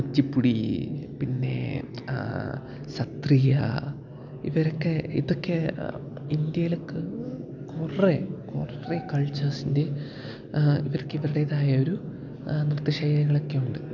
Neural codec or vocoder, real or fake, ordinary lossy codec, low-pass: none; real; none; 7.2 kHz